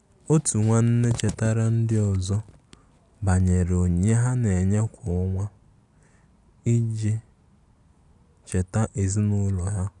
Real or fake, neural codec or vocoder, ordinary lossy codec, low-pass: real; none; none; 10.8 kHz